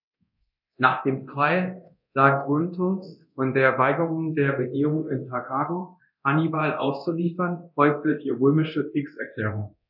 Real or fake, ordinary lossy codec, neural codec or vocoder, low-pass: fake; none; codec, 24 kHz, 0.9 kbps, DualCodec; 5.4 kHz